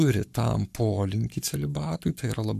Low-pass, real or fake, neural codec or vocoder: 14.4 kHz; fake; codec, 44.1 kHz, 7.8 kbps, Pupu-Codec